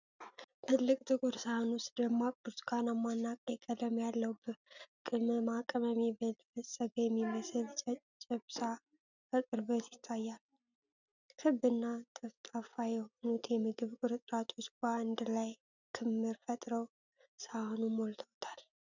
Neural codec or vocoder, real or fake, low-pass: none; real; 7.2 kHz